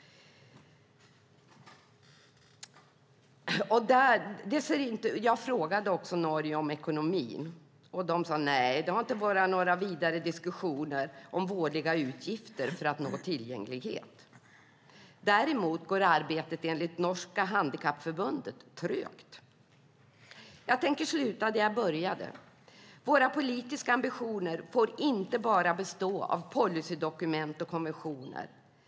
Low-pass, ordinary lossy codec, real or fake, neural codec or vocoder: none; none; real; none